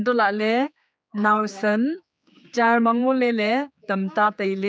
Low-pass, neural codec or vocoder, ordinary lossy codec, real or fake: none; codec, 16 kHz, 4 kbps, X-Codec, HuBERT features, trained on general audio; none; fake